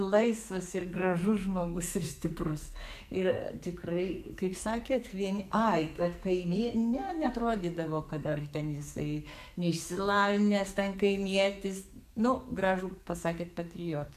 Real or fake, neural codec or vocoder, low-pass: fake; codec, 44.1 kHz, 2.6 kbps, SNAC; 14.4 kHz